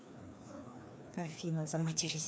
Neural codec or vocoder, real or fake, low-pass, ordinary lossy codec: codec, 16 kHz, 2 kbps, FreqCodec, larger model; fake; none; none